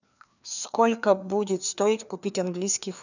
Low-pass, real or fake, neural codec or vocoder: 7.2 kHz; fake; codec, 16 kHz, 2 kbps, FreqCodec, larger model